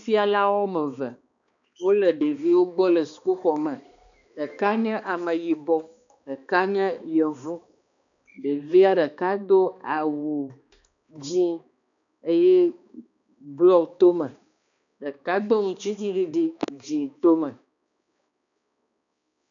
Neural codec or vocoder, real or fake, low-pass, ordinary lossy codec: codec, 16 kHz, 2 kbps, X-Codec, HuBERT features, trained on balanced general audio; fake; 7.2 kHz; MP3, 96 kbps